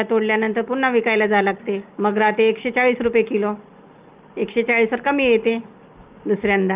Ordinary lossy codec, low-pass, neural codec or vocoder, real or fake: Opus, 32 kbps; 3.6 kHz; none; real